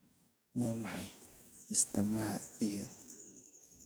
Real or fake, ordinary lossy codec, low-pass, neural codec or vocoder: fake; none; none; codec, 44.1 kHz, 2.6 kbps, DAC